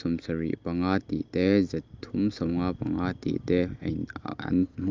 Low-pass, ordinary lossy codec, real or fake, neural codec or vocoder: 7.2 kHz; Opus, 24 kbps; real; none